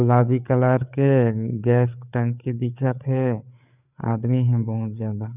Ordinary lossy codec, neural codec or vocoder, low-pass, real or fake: none; codec, 16 kHz, 4 kbps, FreqCodec, larger model; 3.6 kHz; fake